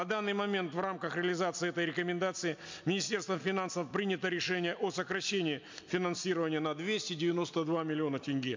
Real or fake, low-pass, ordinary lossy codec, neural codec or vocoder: real; 7.2 kHz; MP3, 64 kbps; none